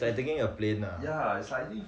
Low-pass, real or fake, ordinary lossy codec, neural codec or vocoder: none; real; none; none